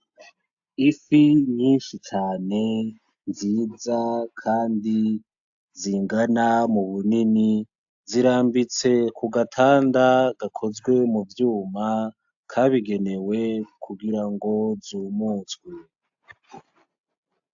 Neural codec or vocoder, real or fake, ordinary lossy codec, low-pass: none; real; AAC, 64 kbps; 7.2 kHz